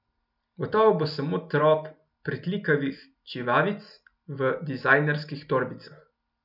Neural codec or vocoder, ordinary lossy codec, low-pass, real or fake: none; none; 5.4 kHz; real